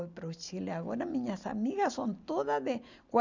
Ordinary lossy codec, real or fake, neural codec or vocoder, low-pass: none; real; none; 7.2 kHz